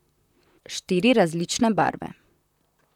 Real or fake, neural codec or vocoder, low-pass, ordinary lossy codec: fake; vocoder, 44.1 kHz, 128 mel bands, Pupu-Vocoder; 19.8 kHz; none